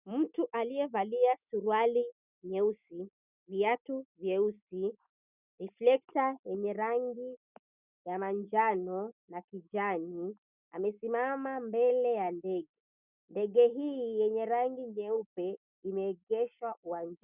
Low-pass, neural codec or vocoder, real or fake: 3.6 kHz; none; real